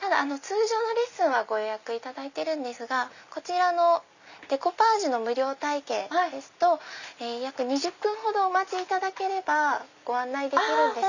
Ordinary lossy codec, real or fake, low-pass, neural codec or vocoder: none; real; 7.2 kHz; none